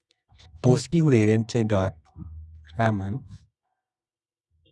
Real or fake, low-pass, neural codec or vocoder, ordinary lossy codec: fake; none; codec, 24 kHz, 0.9 kbps, WavTokenizer, medium music audio release; none